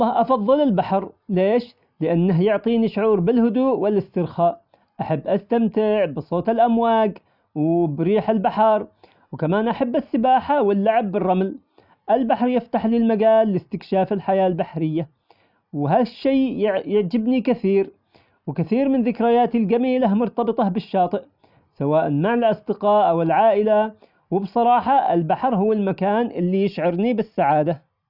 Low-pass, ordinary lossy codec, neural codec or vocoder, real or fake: 5.4 kHz; none; none; real